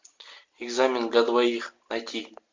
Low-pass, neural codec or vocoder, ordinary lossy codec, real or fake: 7.2 kHz; none; MP3, 48 kbps; real